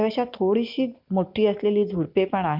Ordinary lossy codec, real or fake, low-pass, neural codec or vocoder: none; real; 5.4 kHz; none